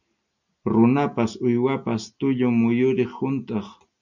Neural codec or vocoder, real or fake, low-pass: none; real; 7.2 kHz